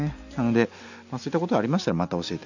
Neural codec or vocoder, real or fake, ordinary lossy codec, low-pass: none; real; none; 7.2 kHz